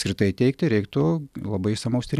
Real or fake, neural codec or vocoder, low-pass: real; none; 14.4 kHz